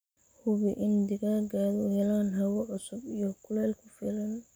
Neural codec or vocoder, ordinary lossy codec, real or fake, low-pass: none; none; real; none